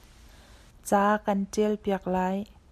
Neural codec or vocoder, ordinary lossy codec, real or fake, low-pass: none; AAC, 96 kbps; real; 14.4 kHz